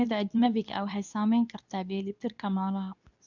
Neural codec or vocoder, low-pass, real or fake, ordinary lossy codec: codec, 24 kHz, 0.9 kbps, WavTokenizer, medium speech release version 2; 7.2 kHz; fake; none